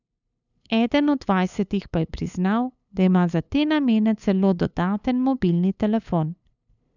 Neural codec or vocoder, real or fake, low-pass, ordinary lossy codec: codec, 16 kHz, 8 kbps, FunCodec, trained on LibriTTS, 25 frames a second; fake; 7.2 kHz; none